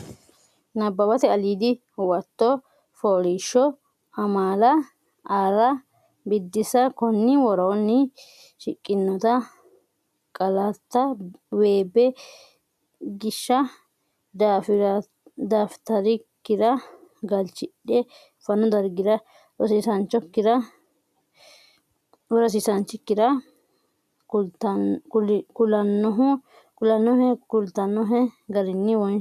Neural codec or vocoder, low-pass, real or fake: none; 14.4 kHz; real